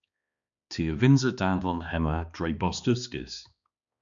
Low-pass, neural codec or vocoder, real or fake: 7.2 kHz; codec, 16 kHz, 2 kbps, X-Codec, HuBERT features, trained on balanced general audio; fake